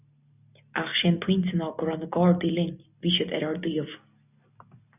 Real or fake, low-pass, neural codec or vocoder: real; 3.6 kHz; none